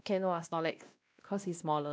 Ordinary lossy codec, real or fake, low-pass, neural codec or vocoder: none; fake; none; codec, 16 kHz, 1 kbps, X-Codec, WavLM features, trained on Multilingual LibriSpeech